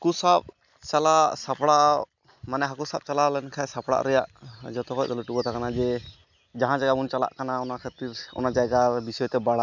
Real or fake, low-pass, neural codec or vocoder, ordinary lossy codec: real; 7.2 kHz; none; none